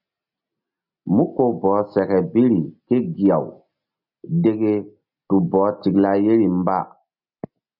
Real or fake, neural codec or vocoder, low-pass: real; none; 5.4 kHz